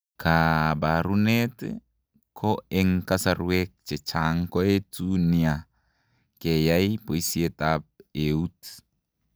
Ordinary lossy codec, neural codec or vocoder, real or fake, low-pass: none; none; real; none